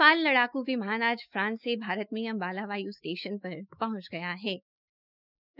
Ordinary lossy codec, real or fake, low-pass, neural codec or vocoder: none; fake; 5.4 kHz; autoencoder, 48 kHz, 128 numbers a frame, DAC-VAE, trained on Japanese speech